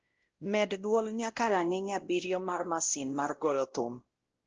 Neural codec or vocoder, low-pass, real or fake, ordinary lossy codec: codec, 16 kHz, 0.5 kbps, X-Codec, WavLM features, trained on Multilingual LibriSpeech; 7.2 kHz; fake; Opus, 16 kbps